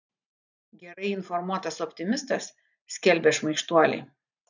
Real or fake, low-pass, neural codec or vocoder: fake; 7.2 kHz; vocoder, 44.1 kHz, 128 mel bands every 512 samples, BigVGAN v2